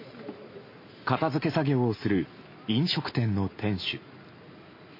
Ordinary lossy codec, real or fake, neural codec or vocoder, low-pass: MP3, 24 kbps; real; none; 5.4 kHz